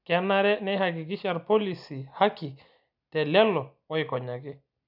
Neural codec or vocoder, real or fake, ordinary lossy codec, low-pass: none; real; none; 5.4 kHz